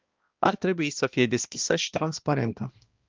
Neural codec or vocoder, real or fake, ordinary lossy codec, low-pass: codec, 16 kHz, 1 kbps, X-Codec, HuBERT features, trained on balanced general audio; fake; Opus, 24 kbps; 7.2 kHz